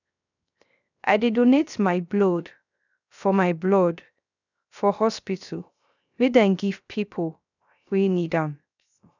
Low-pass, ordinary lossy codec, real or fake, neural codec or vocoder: 7.2 kHz; none; fake; codec, 16 kHz, 0.3 kbps, FocalCodec